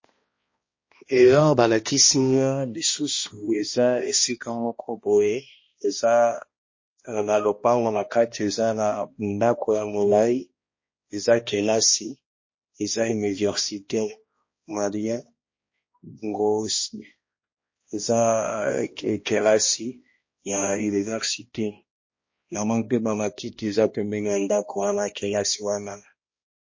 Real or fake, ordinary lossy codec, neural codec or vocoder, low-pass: fake; MP3, 32 kbps; codec, 16 kHz, 1 kbps, X-Codec, HuBERT features, trained on balanced general audio; 7.2 kHz